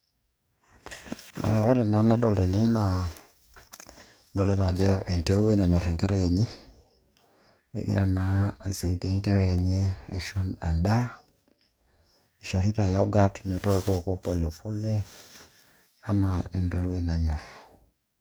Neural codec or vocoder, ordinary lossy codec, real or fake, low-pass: codec, 44.1 kHz, 2.6 kbps, DAC; none; fake; none